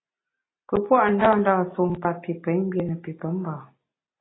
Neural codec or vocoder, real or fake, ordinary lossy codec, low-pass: none; real; AAC, 16 kbps; 7.2 kHz